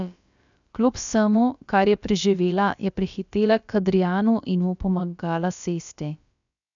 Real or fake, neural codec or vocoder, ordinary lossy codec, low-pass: fake; codec, 16 kHz, about 1 kbps, DyCAST, with the encoder's durations; none; 7.2 kHz